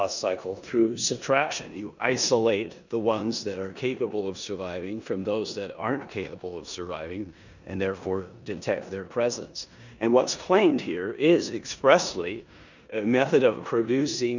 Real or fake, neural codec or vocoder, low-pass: fake; codec, 16 kHz in and 24 kHz out, 0.9 kbps, LongCat-Audio-Codec, four codebook decoder; 7.2 kHz